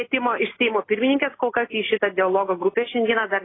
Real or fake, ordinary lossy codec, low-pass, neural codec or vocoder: real; AAC, 16 kbps; 7.2 kHz; none